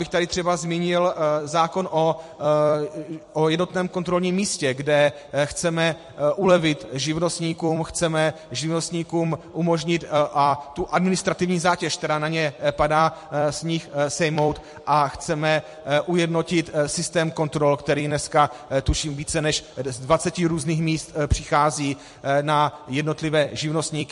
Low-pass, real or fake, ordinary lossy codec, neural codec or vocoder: 14.4 kHz; fake; MP3, 48 kbps; vocoder, 44.1 kHz, 128 mel bands every 256 samples, BigVGAN v2